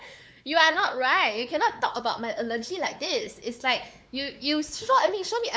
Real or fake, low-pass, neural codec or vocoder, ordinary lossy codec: fake; none; codec, 16 kHz, 4 kbps, X-Codec, WavLM features, trained on Multilingual LibriSpeech; none